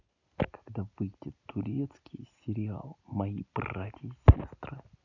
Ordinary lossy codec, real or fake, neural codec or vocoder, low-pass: none; real; none; 7.2 kHz